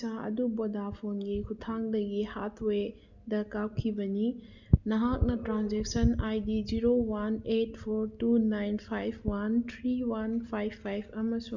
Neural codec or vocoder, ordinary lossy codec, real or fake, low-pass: none; none; real; 7.2 kHz